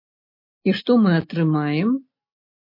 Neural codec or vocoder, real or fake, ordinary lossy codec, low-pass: none; real; MP3, 32 kbps; 5.4 kHz